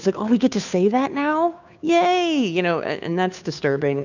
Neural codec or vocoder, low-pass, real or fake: codec, 16 kHz, 2 kbps, FunCodec, trained on Chinese and English, 25 frames a second; 7.2 kHz; fake